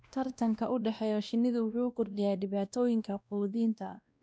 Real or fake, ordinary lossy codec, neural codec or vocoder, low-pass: fake; none; codec, 16 kHz, 1 kbps, X-Codec, WavLM features, trained on Multilingual LibriSpeech; none